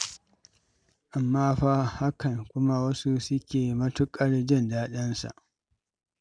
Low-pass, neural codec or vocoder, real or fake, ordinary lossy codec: 9.9 kHz; none; real; none